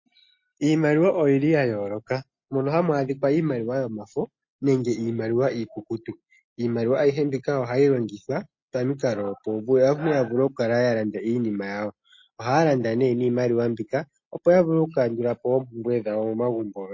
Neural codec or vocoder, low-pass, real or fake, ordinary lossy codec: none; 7.2 kHz; real; MP3, 32 kbps